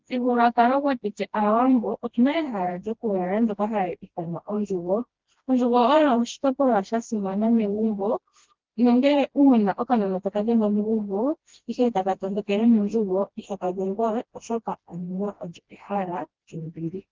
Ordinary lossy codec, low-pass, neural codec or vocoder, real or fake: Opus, 16 kbps; 7.2 kHz; codec, 16 kHz, 1 kbps, FreqCodec, smaller model; fake